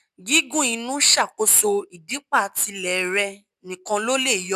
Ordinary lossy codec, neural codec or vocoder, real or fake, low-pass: none; none; real; 14.4 kHz